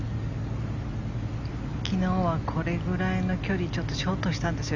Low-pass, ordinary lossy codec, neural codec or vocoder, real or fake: 7.2 kHz; none; none; real